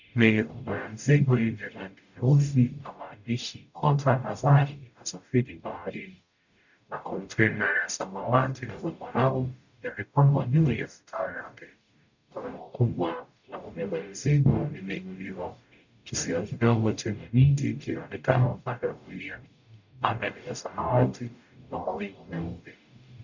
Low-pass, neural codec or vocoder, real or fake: 7.2 kHz; codec, 44.1 kHz, 0.9 kbps, DAC; fake